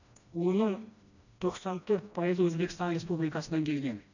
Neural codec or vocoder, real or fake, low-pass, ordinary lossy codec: codec, 16 kHz, 1 kbps, FreqCodec, smaller model; fake; 7.2 kHz; none